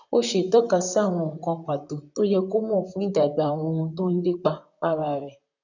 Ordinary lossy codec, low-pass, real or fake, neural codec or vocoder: none; 7.2 kHz; fake; vocoder, 44.1 kHz, 128 mel bands, Pupu-Vocoder